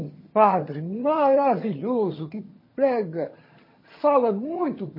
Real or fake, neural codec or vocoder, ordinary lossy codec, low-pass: fake; vocoder, 22.05 kHz, 80 mel bands, HiFi-GAN; MP3, 24 kbps; 5.4 kHz